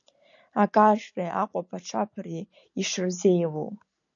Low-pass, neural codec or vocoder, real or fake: 7.2 kHz; none; real